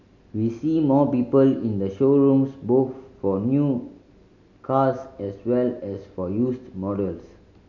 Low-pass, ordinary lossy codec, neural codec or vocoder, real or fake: 7.2 kHz; none; none; real